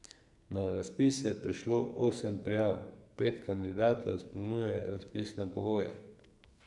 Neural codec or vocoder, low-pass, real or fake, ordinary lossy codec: codec, 44.1 kHz, 2.6 kbps, SNAC; 10.8 kHz; fake; none